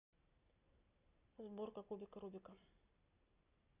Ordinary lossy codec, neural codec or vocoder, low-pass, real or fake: Opus, 64 kbps; none; 3.6 kHz; real